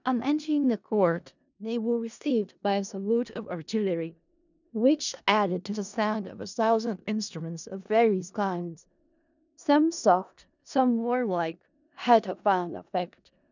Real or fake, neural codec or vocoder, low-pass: fake; codec, 16 kHz in and 24 kHz out, 0.4 kbps, LongCat-Audio-Codec, four codebook decoder; 7.2 kHz